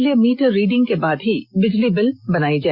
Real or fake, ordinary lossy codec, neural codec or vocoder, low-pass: real; Opus, 64 kbps; none; 5.4 kHz